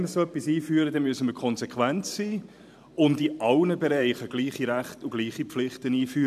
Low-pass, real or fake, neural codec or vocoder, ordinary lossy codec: 14.4 kHz; fake; vocoder, 44.1 kHz, 128 mel bands every 512 samples, BigVGAN v2; none